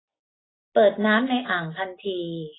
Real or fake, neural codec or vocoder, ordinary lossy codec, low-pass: real; none; AAC, 16 kbps; 7.2 kHz